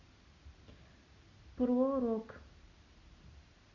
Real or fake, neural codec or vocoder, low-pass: real; none; 7.2 kHz